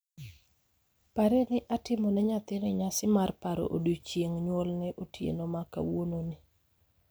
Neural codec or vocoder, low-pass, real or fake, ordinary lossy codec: none; none; real; none